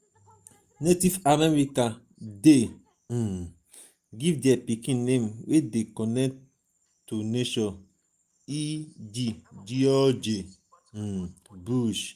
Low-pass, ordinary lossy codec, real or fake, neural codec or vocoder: 14.4 kHz; Opus, 32 kbps; real; none